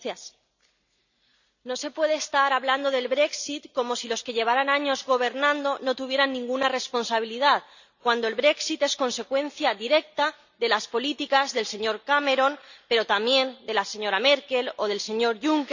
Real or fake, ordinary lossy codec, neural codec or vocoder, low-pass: real; none; none; 7.2 kHz